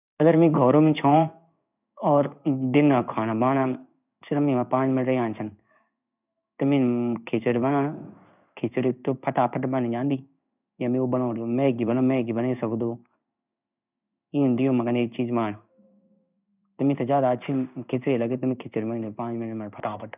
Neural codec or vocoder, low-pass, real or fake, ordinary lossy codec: codec, 16 kHz in and 24 kHz out, 1 kbps, XY-Tokenizer; 3.6 kHz; fake; none